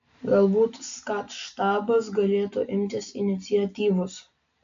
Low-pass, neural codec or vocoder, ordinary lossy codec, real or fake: 7.2 kHz; none; AAC, 64 kbps; real